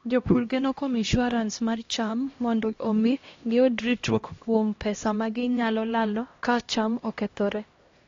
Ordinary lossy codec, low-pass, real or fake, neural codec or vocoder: AAC, 32 kbps; 7.2 kHz; fake; codec, 16 kHz, 1 kbps, X-Codec, HuBERT features, trained on LibriSpeech